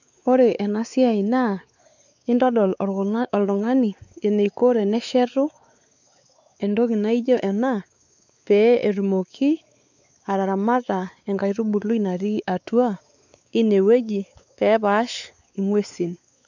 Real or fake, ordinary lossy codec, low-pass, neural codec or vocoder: fake; none; 7.2 kHz; codec, 16 kHz, 4 kbps, X-Codec, WavLM features, trained on Multilingual LibriSpeech